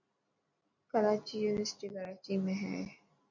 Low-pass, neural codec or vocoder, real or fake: 7.2 kHz; none; real